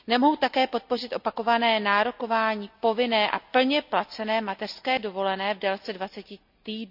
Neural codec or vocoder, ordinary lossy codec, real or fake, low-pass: none; none; real; 5.4 kHz